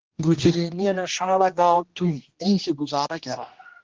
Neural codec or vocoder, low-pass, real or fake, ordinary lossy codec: codec, 16 kHz, 1 kbps, X-Codec, HuBERT features, trained on general audio; 7.2 kHz; fake; Opus, 16 kbps